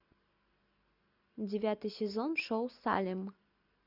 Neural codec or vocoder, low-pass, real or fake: none; 5.4 kHz; real